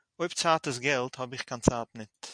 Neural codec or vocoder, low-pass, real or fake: none; 9.9 kHz; real